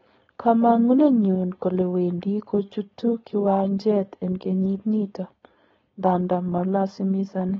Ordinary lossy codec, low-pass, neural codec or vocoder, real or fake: AAC, 24 kbps; 7.2 kHz; codec, 16 kHz, 4.8 kbps, FACodec; fake